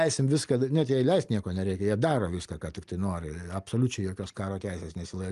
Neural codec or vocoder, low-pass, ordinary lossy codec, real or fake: none; 10.8 kHz; Opus, 32 kbps; real